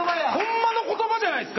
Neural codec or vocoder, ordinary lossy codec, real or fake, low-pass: none; MP3, 24 kbps; real; 7.2 kHz